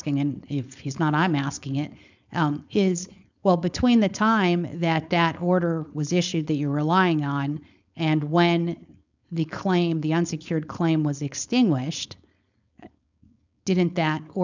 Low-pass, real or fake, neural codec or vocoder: 7.2 kHz; fake; codec, 16 kHz, 4.8 kbps, FACodec